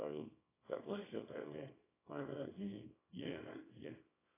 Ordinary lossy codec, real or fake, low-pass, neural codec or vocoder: AAC, 16 kbps; fake; 3.6 kHz; codec, 24 kHz, 0.9 kbps, WavTokenizer, small release